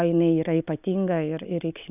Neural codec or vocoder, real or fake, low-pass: none; real; 3.6 kHz